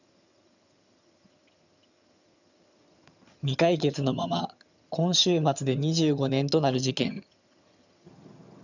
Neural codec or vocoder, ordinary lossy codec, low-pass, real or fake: vocoder, 22.05 kHz, 80 mel bands, HiFi-GAN; none; 7.2 kHz; fake